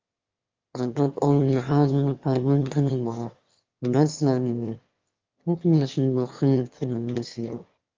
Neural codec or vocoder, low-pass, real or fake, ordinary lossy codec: autoencoder, 22.05 kHz, a latent of 192 numbers a frame, VITS, trained on one speaker; 7.2 kHz; fake; Opus, 32 kbps